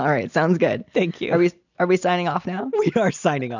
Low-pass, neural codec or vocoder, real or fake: 7.2 kHz; none; real